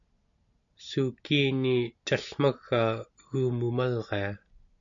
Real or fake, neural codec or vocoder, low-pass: real; none; 7.2 kHz